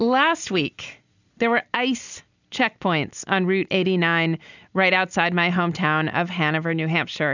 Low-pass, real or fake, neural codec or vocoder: 7.2 kHz; real; none